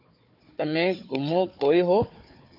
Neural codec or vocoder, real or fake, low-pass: codec, 16 kHz, 4 kbps, FunCodec, trained on Chinese and English, 50 frames a second; fake; 5.4 kHz